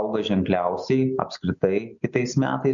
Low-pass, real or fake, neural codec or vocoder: 7.2 kHz; real; none